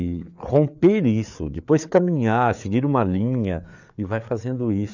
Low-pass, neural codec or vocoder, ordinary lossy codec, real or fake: 7.2 kHz; codec, 16 kHz, 4 kbps, FreqCodec, larger model; none; fake